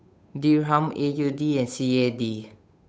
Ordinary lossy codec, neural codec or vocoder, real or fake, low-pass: none; codec, 16 kHz, 8 kbps, FunCodec, trained on Chinese and English, 25 frames a second; fake; none